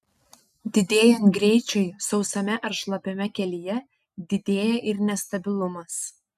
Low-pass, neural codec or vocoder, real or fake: 14.4 kHz; none; real